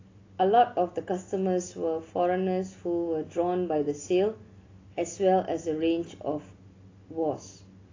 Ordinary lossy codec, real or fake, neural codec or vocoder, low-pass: AAC, 32 kbps; real; none; 7.2 kHz